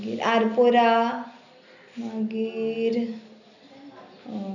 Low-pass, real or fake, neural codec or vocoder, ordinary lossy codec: 7.2 kHz; real; none; none